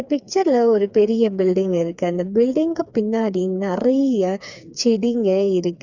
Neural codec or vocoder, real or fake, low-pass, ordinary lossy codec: codec, 16 kHz, 4 kbps, FreqCodec, smaller model; fake; 7.2 kHz; Opus, 64 kbps